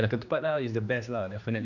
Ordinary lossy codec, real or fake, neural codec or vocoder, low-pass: none; fake; codec, 16 kHz, 2 kbps, X-Codec, HuBERT features, trained on general audio; 7.2 kHz